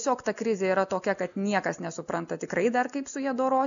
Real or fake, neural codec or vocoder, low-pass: real; none; 7.2 kHz